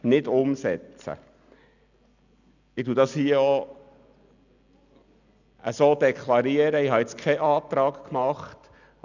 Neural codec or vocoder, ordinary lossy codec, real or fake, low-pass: none; none; real; 7.2 kHz